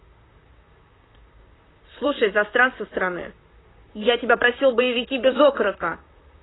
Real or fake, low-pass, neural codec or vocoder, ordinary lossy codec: fake; 7.2 kHz; vocoder, 44.1 kHz, 128 mel bands, Pupu-Vocoder; AAC, 16 kbps